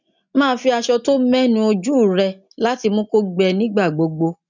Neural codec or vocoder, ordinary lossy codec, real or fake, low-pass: none; none; real; 7.2 kHz